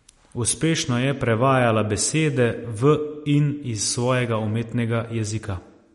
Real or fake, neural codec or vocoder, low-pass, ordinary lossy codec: real; none; 19.8 kHz; MP3, 48 kbps